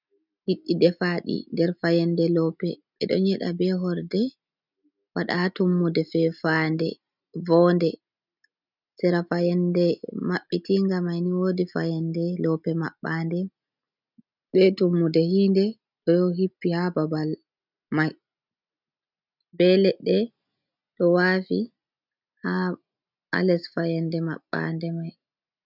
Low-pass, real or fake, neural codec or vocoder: 5.4 kHz; real; none